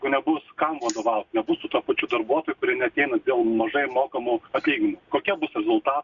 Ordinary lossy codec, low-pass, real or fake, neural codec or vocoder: Opus, 64 kbps; 7.2 kHz; real; none